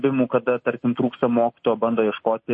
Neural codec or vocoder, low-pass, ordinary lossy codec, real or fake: none; 9.9 kHz; MP3, 32 kbps; real